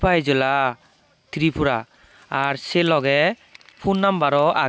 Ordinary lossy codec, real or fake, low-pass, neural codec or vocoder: none; real; none; none